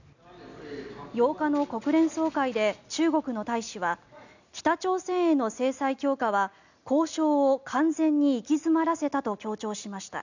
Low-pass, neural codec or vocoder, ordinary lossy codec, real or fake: 7.2 kHz; none; none; real